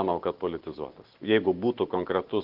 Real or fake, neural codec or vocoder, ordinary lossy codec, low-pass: real; none; Opus, 16 kbps; 5.4 kHz